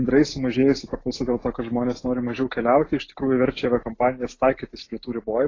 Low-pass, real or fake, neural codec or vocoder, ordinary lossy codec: 7.2 kHz; real; none; AAC, 32 kbps